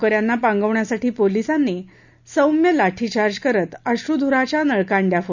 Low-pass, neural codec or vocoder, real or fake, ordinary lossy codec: 7.2 kHz; none; real; none